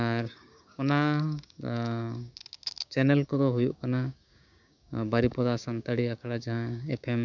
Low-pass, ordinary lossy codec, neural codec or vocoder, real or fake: 7.2 kHz; none; codec, 16 kHz, 6 kbps, DAC; fake